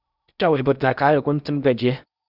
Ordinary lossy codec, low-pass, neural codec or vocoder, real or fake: Opus, 64 kbps; 5.4 kHz; codec, 16 kHz in and 24 kHz out, 0.8 kbps, FocalCodec, streaming, 65536 codes; fake